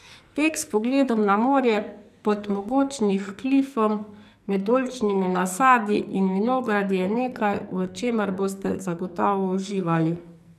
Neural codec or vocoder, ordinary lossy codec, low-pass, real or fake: codec, 44.1 kHz, 2.6 kbps, SNAC; none; 14.4 kHz; fake